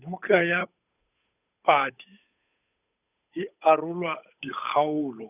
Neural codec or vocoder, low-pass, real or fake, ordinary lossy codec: codec, 16 kHz, 8 kbps, FunCodec, trained on Chinese and English, 25 frames a second; 3.6 kHz; fake; none